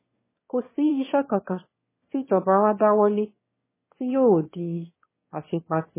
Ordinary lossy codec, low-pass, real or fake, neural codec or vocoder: MP3, 16 kbps; 3.6 kHz; fake; autoencoder, 22.05 kHz, a latent of 192 numbers a frame, VITS, trained on one speaker